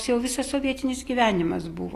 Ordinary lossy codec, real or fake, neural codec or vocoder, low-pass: AAC, 48 kbps; real; none; 14.4 kHz